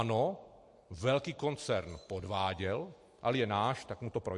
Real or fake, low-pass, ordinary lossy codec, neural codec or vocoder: real; 10.8 kHz; MP3, 48 kbps; none